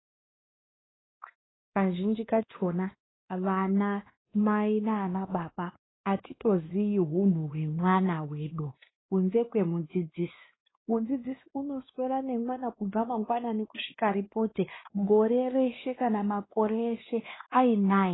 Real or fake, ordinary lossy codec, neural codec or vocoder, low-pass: fake; AAC, 16 kbps; codec, 16 kHz, 2 kbps, X-Codec, WavLM features, trained on Multilingual LibriSpeech; 7.2 kHz